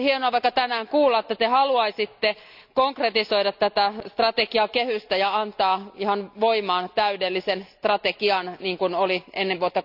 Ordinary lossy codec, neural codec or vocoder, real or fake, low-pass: none; none; real; 5.4 kHz